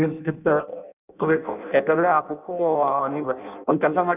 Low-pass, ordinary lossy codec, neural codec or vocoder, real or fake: 3.6 kHz; none; codec, 16 kHz in and 24 kHz out, 0.6 kbps, FireRedTTS-2 codec; fake